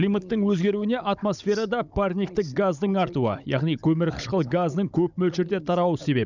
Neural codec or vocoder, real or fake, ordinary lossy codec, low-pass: codec, 16 kHz, 16 kbps, FunCodec, trained on Chinese and English, 50 frames a second; fake; none; 7.2 kHz